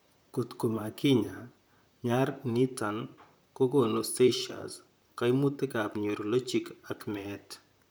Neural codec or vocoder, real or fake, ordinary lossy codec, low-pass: vocoder, 44.1 kHz, 128 mel bands, Pupu-Vocoder; fake; none; none